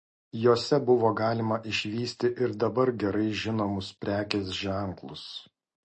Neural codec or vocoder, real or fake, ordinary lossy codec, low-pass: none; real; MP3, 32 kbps; 10.8 kHz